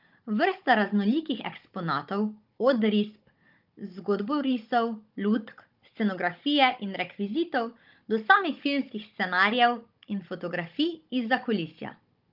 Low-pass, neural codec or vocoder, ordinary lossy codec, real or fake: 5.4 kHz; codec, 16 kHz, 16 kbps, FunCodec, trained on Chinese and English, 50 frames a second; Opus, 24 kbps; fake